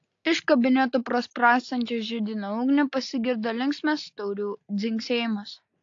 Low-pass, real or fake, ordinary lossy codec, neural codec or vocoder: 7.2 kHz; real; AAC, 48 kbps; none